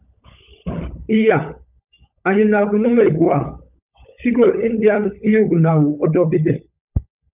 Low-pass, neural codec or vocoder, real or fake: 3.6 kHz; codec, 16 kHz, 16 kbps, FunCodec, trained on LibriTTS, 50 frames a second; fake